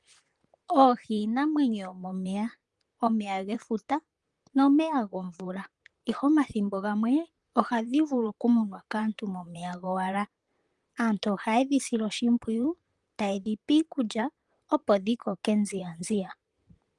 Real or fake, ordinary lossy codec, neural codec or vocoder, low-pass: fake; Opus, 32 kbps; vocoder, 44.1 kHz, 128 mel bands, Pupu-Vocoder; 10.8 kHz